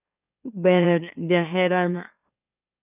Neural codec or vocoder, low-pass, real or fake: autoencoder, 44.1 kHz, a latent of 192 numbers a frame, MeloTTS; 3.6 kHz; fake